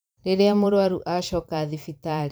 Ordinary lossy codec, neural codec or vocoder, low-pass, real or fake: none; vocoder, 44.1 kHz, 128 mel bands every 512 samples, BigVGAN v2; none; fake